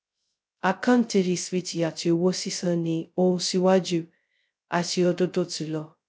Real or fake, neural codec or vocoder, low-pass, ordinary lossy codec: fake; codec, 16 kHz, 0.2 kbps, FocalCodec; none; none